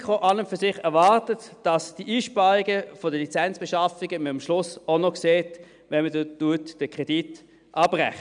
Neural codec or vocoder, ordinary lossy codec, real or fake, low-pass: none; none; real; 9.9 kHz